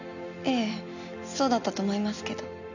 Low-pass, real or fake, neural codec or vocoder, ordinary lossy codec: 7.2 kHz; real; none; none